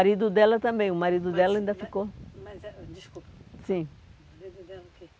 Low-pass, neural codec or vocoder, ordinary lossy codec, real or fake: none; none; none; real